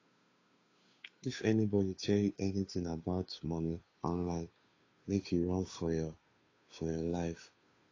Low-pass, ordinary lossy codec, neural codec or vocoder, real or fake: 7.2 kHz; AAC, 32 kbps; codec, 16 kHz, 2 kbps, FunCodec, trained on Chinese and English, 25 frames a second; fake